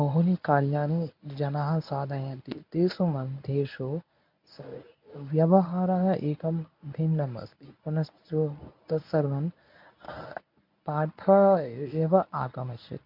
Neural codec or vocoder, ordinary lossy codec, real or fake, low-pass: codec, 24 kHz, 0.9 kbps, WavTokenizer, medium speech release version 1; MP3, 48 kbps; fake; 5.4 kHz